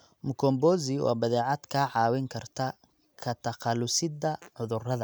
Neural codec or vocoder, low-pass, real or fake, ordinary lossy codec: none; none; real; none